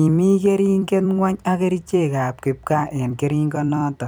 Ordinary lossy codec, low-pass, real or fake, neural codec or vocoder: none; none; fake; vocoder, 44.1 kHz, 128 mel bands every 256 samples, BigVGAN v2